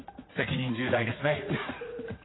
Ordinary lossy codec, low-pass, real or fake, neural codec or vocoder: AAC, 16 kbps; 7.2 kHz; fake; vocoder, 44.1 kHz, 80 mel bands, Vocos